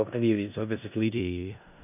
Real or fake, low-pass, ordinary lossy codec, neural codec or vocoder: fake; 3.6 kHz; none; codec, 16 kHz in and 24 kHz out, 0.4 kbps, LongCat-Audio-Codec, four codebook decoder